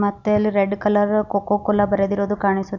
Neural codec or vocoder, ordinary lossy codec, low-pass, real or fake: none; none; 7.2 kHz; real